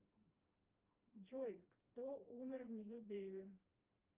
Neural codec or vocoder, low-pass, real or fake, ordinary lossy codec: codec, 16 kHz, 1 kbps, FreqCodec, smaller model; 3.6 kHz; fake; Opus, 32 kbps